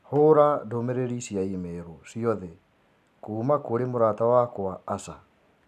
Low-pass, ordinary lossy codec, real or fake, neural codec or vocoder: 14.4 kHz; none; real; none